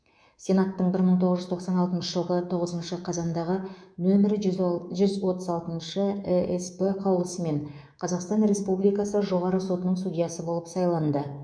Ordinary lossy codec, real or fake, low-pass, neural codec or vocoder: none; fake; 9.9 kHz; codec, 24 kHz, 3.1 kbps, DualCodec